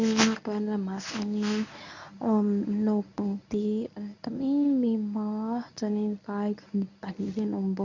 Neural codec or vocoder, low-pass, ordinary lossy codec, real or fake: codec, 24 kHz, 0.9 kbps, WavTokenizer, medium speech release version 1; 7.2 kHz; none; fake